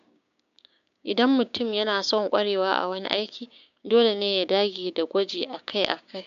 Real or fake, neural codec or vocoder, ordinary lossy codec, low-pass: fake; codec, 16 kHz, 6 kbps, DAC; none; 7.2 kHz